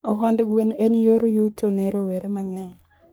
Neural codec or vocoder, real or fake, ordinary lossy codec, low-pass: codec, 44.1 kHz, 3.4 kbps, Pupu-Codec; fake; none; none